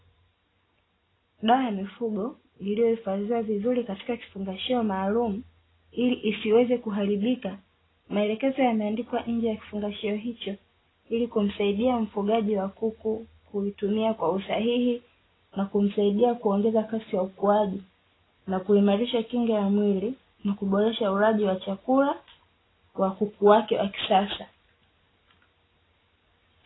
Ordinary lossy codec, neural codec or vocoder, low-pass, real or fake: AAC, 16 kbps; none; 7.2 kHz; real